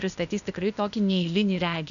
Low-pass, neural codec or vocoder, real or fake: 7.2 kHz; codec, 16 kHz, 0.8 kbps, ZipCodec; fake